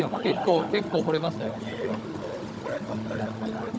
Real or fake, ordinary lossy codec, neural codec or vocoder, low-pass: fake; none; codec, 16 kHz, 16 kbps, FunCodec, trained on Chinese and English, 50 frames a second; none